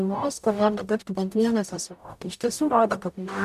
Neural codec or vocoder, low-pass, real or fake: codec, 44.1 kHz, 0.9 kbps, DAC; 14.4 kHz; fake